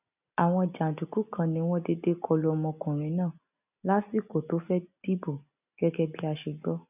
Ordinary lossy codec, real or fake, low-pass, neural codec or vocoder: none; real; 3.6 kHz; none